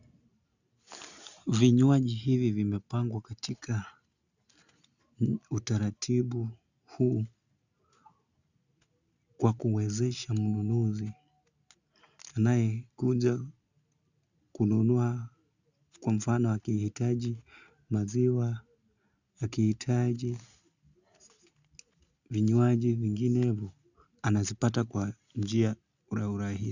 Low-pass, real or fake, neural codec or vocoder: 7.2 kHz; real; none